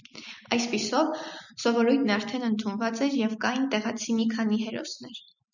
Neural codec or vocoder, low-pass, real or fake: none; 7.2 kHz; real